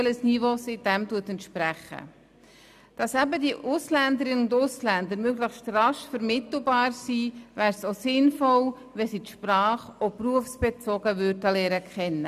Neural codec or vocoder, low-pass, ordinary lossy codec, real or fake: none; 14.4 kHz; none; real